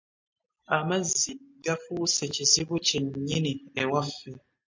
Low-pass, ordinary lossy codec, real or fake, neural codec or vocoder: 7.2 kHz; MP3, 48 kbps; real; none